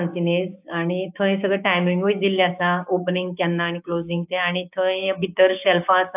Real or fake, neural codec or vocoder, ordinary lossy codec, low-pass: fake; codec, 16 kHz, 6 kbps, DAC; AAC, 32 kbps; 3.6 kHz